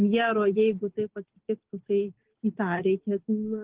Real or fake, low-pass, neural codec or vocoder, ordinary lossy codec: real; 3.6 kHz; none; Opus, 16 kbps